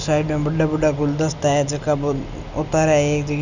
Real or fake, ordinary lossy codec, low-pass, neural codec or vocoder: real; none; 7.2 kHz; none